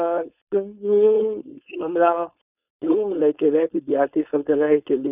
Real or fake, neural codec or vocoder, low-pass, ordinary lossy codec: fake; codec, 16 kHz, 4.8 kbps, FACodec; 3.6 kHz; none